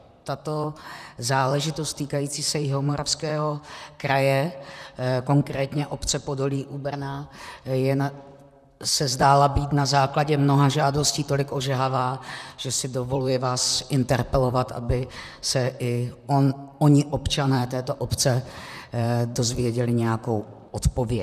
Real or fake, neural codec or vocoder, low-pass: fake; vocoder, 44.1 kHz, 128 mel bands, Pupu-Vocoder; 14.4 kHz